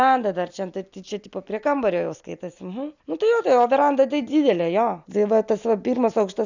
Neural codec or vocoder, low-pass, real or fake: none; 7.2 kHz; real